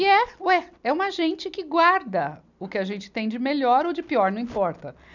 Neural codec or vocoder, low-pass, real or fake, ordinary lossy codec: none; 7.2 kHz; real; none